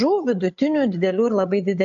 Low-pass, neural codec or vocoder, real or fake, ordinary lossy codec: 7.2 kHz; codec, 16 kHz, 16 kbps, FreqCodec, larger model; fake; AAC, 64 kbps